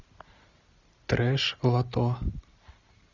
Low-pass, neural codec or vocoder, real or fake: 7.2 kHz; none; real